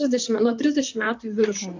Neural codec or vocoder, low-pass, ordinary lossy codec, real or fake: vocoder, 44.1 kHz, 128 mel bands, Pupu-Vocoder; 7.2 kHz; AAC, 48 kbps; fake